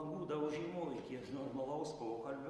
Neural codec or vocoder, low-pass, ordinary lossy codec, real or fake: none; 10.8 kHz; Opus, 32 kbps; real